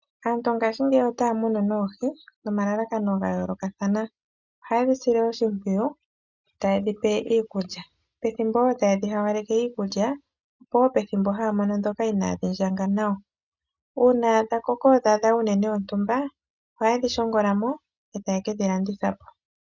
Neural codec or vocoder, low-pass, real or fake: none; 7.2 kHz; real